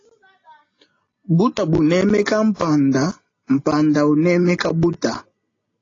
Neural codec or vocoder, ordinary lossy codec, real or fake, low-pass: none; AAC, 32 kbps; real; 7.2 kHz